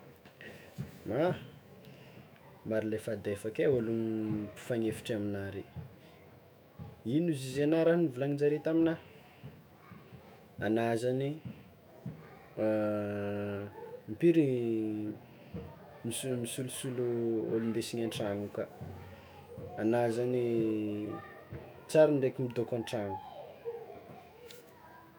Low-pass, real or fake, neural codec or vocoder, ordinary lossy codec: none; fake; autoencoder, 48 kHz, 128 numbers a frame, DAC-VAE, trained on Japanese speech; none